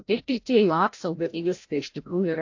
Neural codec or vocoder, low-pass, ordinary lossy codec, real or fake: codec, 16 kHz, 0.5 kbps, FreqCodec, larger model; 7.2 kHz; AAC, 48 kbps; fake